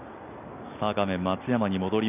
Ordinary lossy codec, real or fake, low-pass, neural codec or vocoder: AAC, 32 kbps; real; 3.6 kHz; none